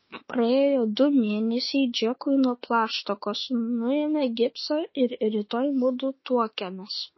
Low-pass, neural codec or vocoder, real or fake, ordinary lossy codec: 7.2 kHz; autoencoder, 48 kHz, 32 numbers a frame, DAC-VAE, trained on Japanese speech; fake; MP3, 24 kbps